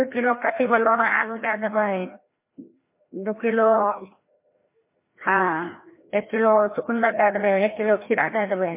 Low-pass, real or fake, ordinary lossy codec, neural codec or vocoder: 3.6 kHz; fake; MP3, 24 kbps; codec, 16 kHz, 1 kbps, FreqCodec, larger model